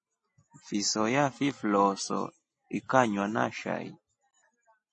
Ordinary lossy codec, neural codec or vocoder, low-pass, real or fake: MP3, 32 kbps; none; 10.8 kHz; real